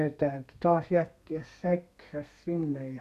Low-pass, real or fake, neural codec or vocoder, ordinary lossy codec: 14.4 kHz; fake; codec, 32 kHz, 1.9 kbps, SNAC; none